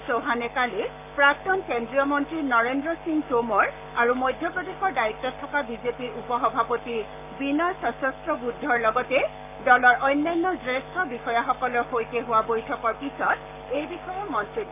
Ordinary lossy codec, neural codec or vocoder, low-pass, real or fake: none; codec, 44.1 kHz, 7.8 kbps, Pupu-Codec; 3.6 kHz; fake